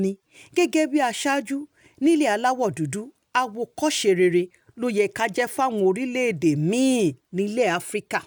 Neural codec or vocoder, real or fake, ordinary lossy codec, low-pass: none; real; none; none